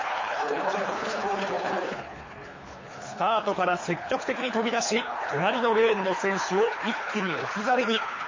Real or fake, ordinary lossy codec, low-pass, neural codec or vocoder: fake; MP3, 32 kbps; 7.2 kHz; codec, 24 kHz, 3 kbps, HILCodec